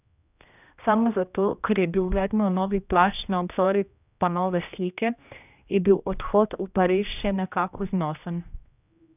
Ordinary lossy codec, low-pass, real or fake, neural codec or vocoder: none; 3.6 kHz; fake; codec, 16 kHz, 1 kbps, X-Codec, HuBERT features, trained on general audio